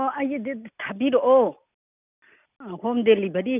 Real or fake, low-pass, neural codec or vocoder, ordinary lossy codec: real; 3.6 kHz; none; none